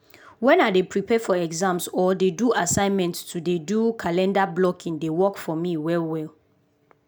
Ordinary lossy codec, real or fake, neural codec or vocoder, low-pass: none; real; none; none